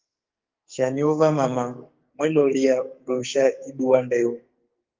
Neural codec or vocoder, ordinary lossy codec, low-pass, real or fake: codec, 44.1 kHz, 2.6 kbps, SNAC; Opus, 32 kbps; 7.2 kHz; fake